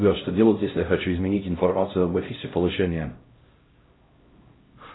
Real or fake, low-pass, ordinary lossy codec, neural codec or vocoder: fake; 7.2 kHz; AAC, 16 kbps; codec, 16 kHz, 0.5 kbps, X-Codec, HuBERT features, trained on LibriSpeech